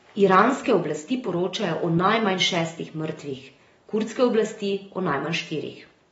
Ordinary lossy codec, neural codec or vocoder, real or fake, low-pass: AAC, 24 kbps; none; real; 19.8 kHz